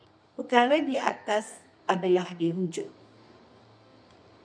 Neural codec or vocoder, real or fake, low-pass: codec, 24 kHz, 0.9 kbps, WavTokenizer, medium music audio release; fake; 9.9 kHz